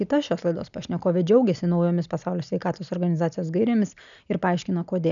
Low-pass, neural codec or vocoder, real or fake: 7.2 kHz; none; real